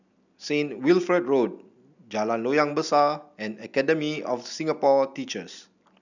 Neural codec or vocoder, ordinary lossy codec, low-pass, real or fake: none; none; 7.2 kHz; real